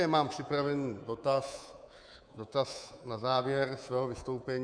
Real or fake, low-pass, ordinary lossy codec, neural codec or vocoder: fake; 9.9 kHz; Opus, 64 kbps; codec, 24 kHz, 3.1 kbps, DualCodec